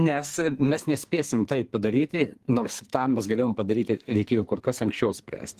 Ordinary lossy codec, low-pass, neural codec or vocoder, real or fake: Opus, 24 kbps; 14.4 kHz; codec, 44.1 kHz, 2.6 kbps, SNAC; fake